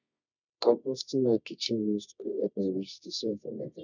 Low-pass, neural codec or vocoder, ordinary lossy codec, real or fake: 7.2 kHz; codec, 24 kHz, 0.9 kbps, WavTokenizer, medium music audio release; none; fake